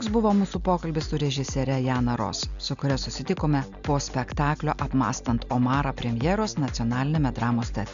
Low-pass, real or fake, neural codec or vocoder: 7.2 kHz; real; none